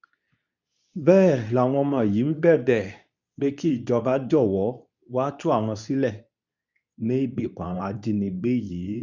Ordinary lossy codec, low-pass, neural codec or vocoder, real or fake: none; 7.2 kHz; codec, 24 kHz, 0.9 kbps, WavTokenizer, medium speech release version 2; fake